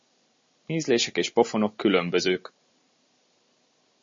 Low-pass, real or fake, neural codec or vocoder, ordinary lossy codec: 7.2 kHz; real; none; MP3, 32 kbps